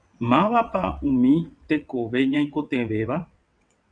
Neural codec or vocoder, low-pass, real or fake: vocoder, 22.05 kHz, 80 mel bands, WaveNeXt; 9.9 kHz; fake